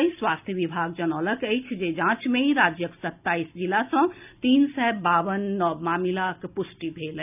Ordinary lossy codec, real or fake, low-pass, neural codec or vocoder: none; real; 3.6 kHz; none